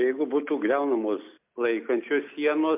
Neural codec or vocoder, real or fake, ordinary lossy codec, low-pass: none; real; MP3, 32 kbps; 3.6 kHz